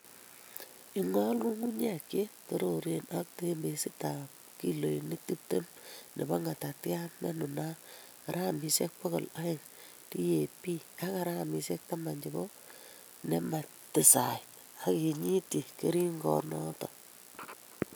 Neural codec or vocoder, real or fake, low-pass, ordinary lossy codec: none; real; none; none